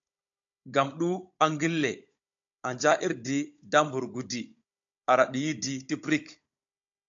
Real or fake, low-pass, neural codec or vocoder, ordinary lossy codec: fake; 7.2 kHz; codec, 16 kHz, 16 kbps, FunCodec, trained on Chinese and English, 50 frames a second; AAC, 64 kbps